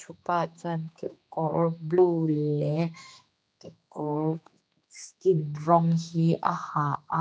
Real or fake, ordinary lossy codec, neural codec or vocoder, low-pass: fake; none; codec, 16 kHz, 2 kbps, X-Codec, HuBERT features, trained on general audio; none